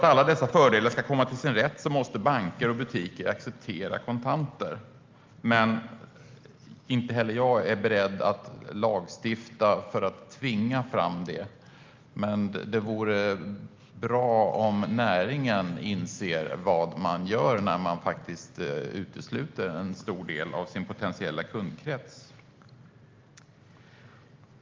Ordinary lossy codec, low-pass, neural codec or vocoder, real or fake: Opus, 32 kbps; 7.2 kHz; none; real